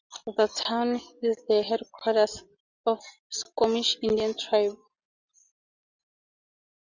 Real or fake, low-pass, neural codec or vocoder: real; 7.2 kHz; none